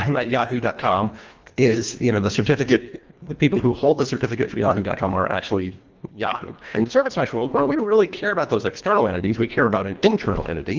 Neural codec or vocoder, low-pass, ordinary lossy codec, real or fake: codec, 24 kHz, 1.5 kbps, HILCodec; 7.2 kHz; Opus, 24 kbps; fake